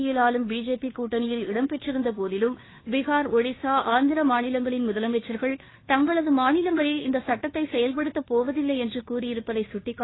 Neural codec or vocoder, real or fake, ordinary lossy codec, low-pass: codec, 16 kHz, 2 kbps, FunCodec, trained on Chinese and English, 25 frames a second; fake; AAC, 16 kbps; 7.2 kHz